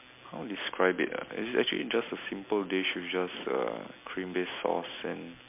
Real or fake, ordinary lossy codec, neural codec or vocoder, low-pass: real; MP3, 32 kbps; none; 3.6 kHz